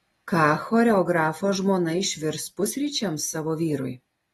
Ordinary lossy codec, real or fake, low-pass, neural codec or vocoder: AAC, 32 kbps; real; 19.8 kHz; none